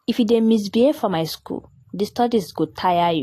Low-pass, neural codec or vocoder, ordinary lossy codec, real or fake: 14.4 kHz; none; AAC, 48 kbps; real